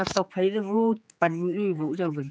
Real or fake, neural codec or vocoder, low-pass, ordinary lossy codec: fake; codec, 16 kHz, 4 kbps, X-Codec, HuBERT features, trained on general audio; none; none